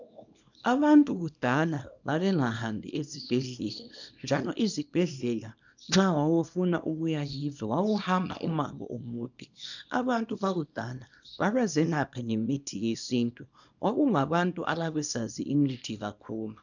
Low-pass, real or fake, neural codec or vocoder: 7.2 kHz; fake; codec, 24 kHz, 0.9 kbps, WavTokenizer, small release